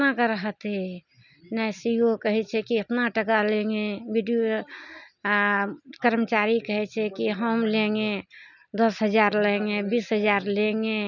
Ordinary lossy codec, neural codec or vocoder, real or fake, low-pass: none; none; real; 7.2 kHz